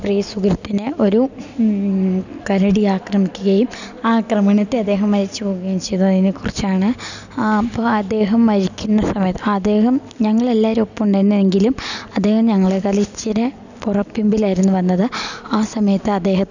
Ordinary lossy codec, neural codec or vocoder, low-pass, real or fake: none; none; 7.2 kHz; real